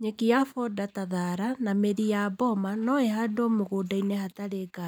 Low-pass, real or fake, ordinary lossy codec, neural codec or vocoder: none; real; none; none